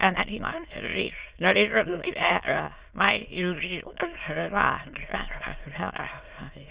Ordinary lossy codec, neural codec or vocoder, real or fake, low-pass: Opus, 64 kbps; autoencoder, 22.05 kHz, a latent of 192 numbers a frame, VITS, trained on many speakers; fake; 3.6 kHz